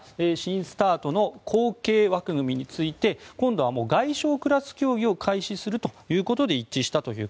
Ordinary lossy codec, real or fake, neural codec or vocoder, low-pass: none; real; none; none